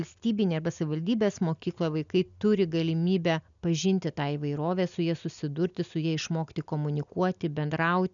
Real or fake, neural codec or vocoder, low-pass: real; none; 7.2 kHz